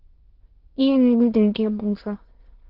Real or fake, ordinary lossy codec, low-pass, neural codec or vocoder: fake; Opus, 16 kbps; 5.4 kHz; autoencoder, 22.05 kHz, a latent of 192 numbers a frame, VITS, trained on many speakers